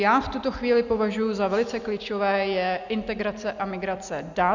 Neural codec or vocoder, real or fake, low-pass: none; real; 7.2 kHz